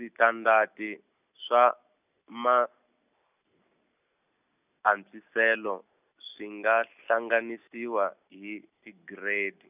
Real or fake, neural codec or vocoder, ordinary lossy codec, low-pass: real; none; none; 3.6 kHz